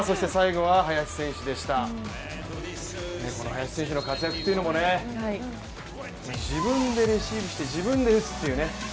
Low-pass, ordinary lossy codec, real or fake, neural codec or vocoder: none; none; real; none